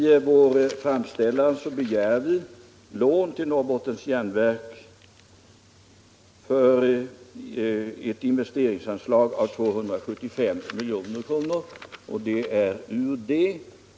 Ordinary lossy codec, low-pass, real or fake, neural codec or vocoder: none; none; real; none